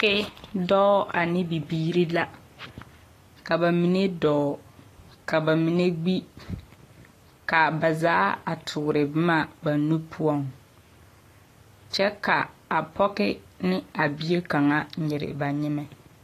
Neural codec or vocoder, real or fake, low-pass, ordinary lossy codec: codec, 44.1 kHz, 7.8 kbps, Pupu-Codec; fake; 14.4 kHz; AAC, 48 kbps